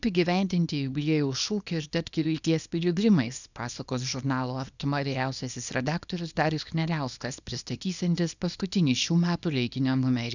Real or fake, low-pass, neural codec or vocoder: fake; 7.2 kHz; codec, 24 kHz, 0.9 kbps, WavTokenizer, small release